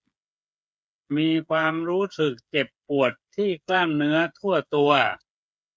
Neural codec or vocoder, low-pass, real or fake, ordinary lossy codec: codec, 16 kHz, 8 kbps, FreqCodec, smaller model; none; fake; none